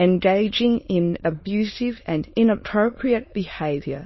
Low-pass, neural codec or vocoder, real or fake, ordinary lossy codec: 7.2 kHz; autoencoder, 22.05 kHz, a latent of 192 numbers a frame, VITS, trained on many speakers; fake; MP3, 24 kbps